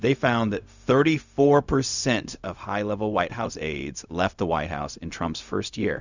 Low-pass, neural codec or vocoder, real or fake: 7.2 kHz; codec, 16 kHz, 0.4 kbps, LongCat-Audio-Codec; fake